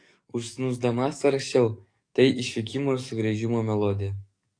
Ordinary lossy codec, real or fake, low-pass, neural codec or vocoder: AAC, 48 kbps; fake; 9.9 kHz; codec, 44.1 kHz, 7.8 kbps, DAC